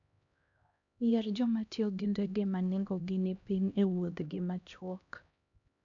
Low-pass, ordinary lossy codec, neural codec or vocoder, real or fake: 7.2 kHz; none; codec, 16 kHz, 1 kbps, X-Codec, HuBERT features, trained on LibriSpeech; fake